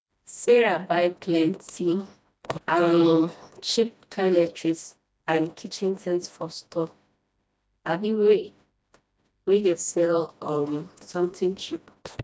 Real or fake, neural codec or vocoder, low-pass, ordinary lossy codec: fake; codec, 16 kHz, 1 kbps, FreqCodec, smaller model; none; none